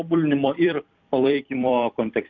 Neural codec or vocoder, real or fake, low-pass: vocoder, 44.1 kHz, 128 mel bands every 256 samples, BigVGAN v2; fake; 7.2 kHz